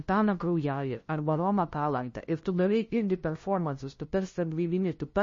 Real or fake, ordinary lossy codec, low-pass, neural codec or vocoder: fake; MP3, 32 kbps; 7.2 kHz; codec, 16 kHz, 0.5 kbps, FunCodec, trained on LibriTTS, 25 frames a second